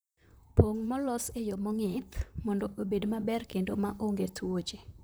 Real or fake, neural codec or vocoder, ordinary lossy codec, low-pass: fake; vocoder, 44.1 kHz, 128 mel bands, Pupu-Vocoder; none; none